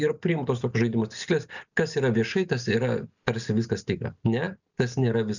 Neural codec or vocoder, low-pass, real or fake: none; 7.2 kHz; real